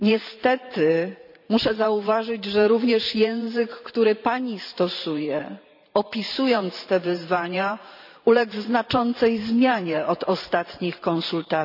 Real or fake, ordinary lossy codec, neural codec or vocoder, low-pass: fake; none; vocoder, 44.1 kHz, 128 mel bands every 512 samples, BigVGAN v2; 5.4 kHz